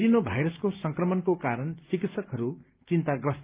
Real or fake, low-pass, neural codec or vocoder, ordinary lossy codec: real; 3.6 kHz; none; Opus, 24 kbps